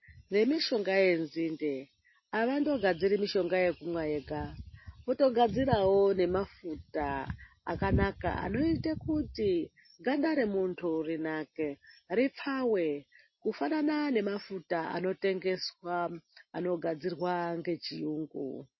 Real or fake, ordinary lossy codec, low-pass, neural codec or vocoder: real; MP3, 24 kbps; 7.2 kHz; none